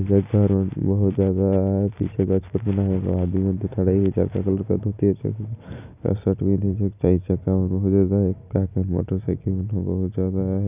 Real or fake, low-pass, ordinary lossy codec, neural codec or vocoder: fake; 3.6 kHz; none; vocoder, 44.1 kHz, 128 mel bands every 256 samples, BigVGAN v2